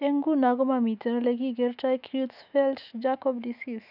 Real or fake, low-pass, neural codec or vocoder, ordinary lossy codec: fake; 5.4 kHz; autoencoder, 48 kHz, 128 numbers a frame, DAC-VAE, trained on Japanese speech; none